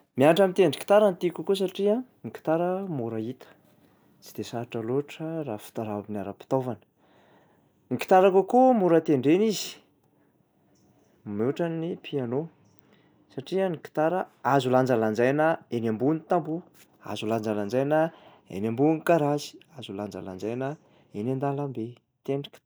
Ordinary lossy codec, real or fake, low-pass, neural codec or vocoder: none; real; none; none